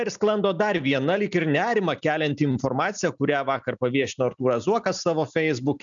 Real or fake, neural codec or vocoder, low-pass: real; none; 7.2 kHz